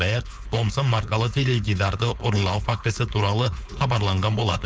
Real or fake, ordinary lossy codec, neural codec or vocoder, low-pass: fake; none; codec, 16 kHz, 4.8 kbps, FACodec; none